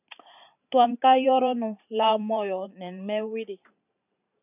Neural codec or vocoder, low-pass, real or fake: vocoder, 44.1 kHz, 128 mel bands every 512 samples, BigVGAN v2; 3.6 kHz; fake